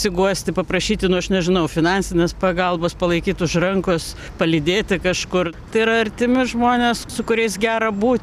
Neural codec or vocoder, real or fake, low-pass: none; real; 14.4 kHz